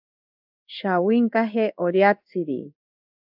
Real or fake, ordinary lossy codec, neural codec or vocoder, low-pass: fake; AAC, 48 kbps; codec, 16 kHz in and 24 kHz out, 1 kbps, XY-Tokenizer; 5.4 kHz